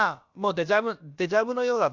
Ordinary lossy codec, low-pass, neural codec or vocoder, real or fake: Opus, 64 kbps; 7.2 kHz; codec, 16 kHz, about 1 kbps, DyCAST, with the encoder's durations; fake